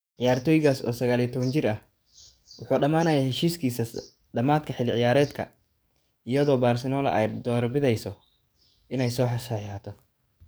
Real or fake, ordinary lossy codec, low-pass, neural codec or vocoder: fake; none; none; codec, 44.1 kHz, 7.8 kbps, DAC